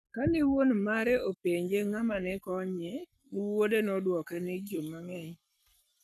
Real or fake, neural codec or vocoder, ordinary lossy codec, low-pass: fake; codec, 44.1 kHz, 7.8 kbps, Pupu-Codec; none; 14.4 kHz